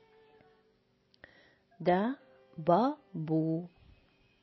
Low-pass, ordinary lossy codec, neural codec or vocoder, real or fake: 7.2 kHz; MP3, 24 kbps; none; real